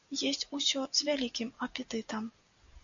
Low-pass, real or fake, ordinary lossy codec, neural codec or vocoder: 7.2 kHz; real; MP3, 64 kbps; none